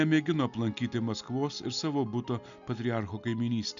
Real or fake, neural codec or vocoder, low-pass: real; none; 7.2 kHz